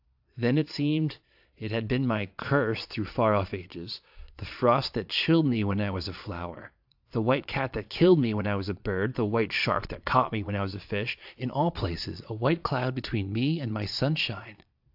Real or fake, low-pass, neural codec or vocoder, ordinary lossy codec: fake; 5.4 kHz; vocoder, 22.05 kHz, 80 mel bands, Vocos; AAC, 48 kbps